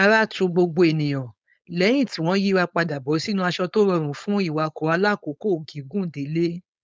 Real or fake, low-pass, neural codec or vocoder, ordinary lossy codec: fake; none; codec, 16 kHz, 4.8 kbps, FACodec; none